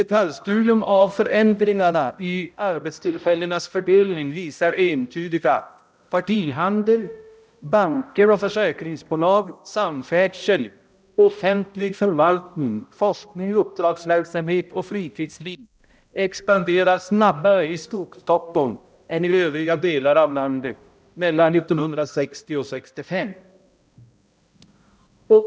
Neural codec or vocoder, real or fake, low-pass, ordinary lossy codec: codec, 16 kHz, 0.5 kbps, X-Codec, HuBERT features, trained on balanced general audio; fake; none; none